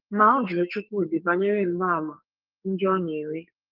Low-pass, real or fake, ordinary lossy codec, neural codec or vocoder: 5.4 kHz; fake; Opus, 32 kbps; codec, 44.1 kHz, 2.6 kbps, SNAC